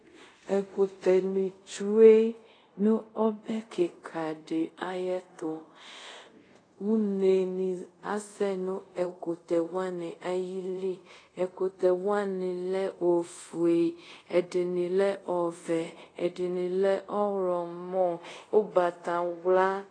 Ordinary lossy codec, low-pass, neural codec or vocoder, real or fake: AAC, 32 kbps; 9.9 kHz; codec, 24 kHz, 0.5 kbps, DualCodec; fake